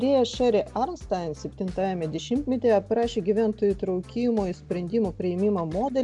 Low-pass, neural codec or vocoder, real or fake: 10.8 kHz; none; real